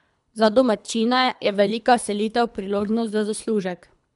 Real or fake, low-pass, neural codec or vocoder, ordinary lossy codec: fake; 10.8 kHz; codec, 24 kHz, 3 kbps, HILCodec; MP3, 96 kbps